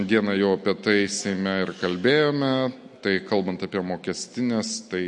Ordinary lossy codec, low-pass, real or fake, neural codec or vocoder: MP3, 48 kbps; 10.8 kHz; real; none